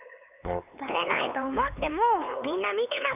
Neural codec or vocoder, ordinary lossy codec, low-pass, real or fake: codec, 16 kHz, 4 kbps, X-Codec, HuBERT features, trained on LibriSpeech; none; 3.6 kHz; fake